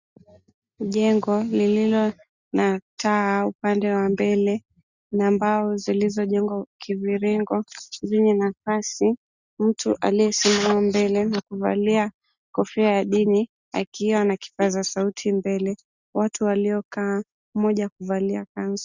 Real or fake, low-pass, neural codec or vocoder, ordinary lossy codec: real; 7.2 kHz; none; Opus, 64 kbps